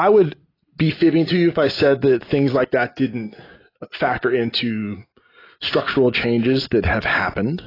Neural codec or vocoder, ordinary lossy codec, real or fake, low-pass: none; AAC, 24 kbps; real; 5.4 kHz